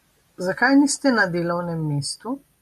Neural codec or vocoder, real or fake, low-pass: none; real; 14.4 kHz